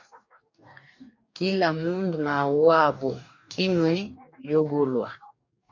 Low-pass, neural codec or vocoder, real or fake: 7.2 kHz; codec, 44.1 kHz, 2.6 kbps, DAC; fake